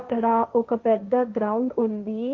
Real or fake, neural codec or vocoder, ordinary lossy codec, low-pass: fake; codec, 16 kHz, 1.1 kbps, Voila-Tokenizer; Opus, 32 kbps; 7.2 kHz